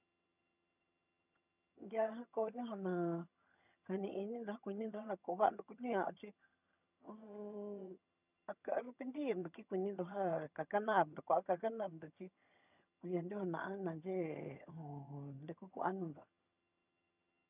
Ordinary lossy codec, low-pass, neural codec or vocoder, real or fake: none; 3.6 kHz; vocoder, 22.05 kHz, 80 mel bands, HiFi-GAN; fake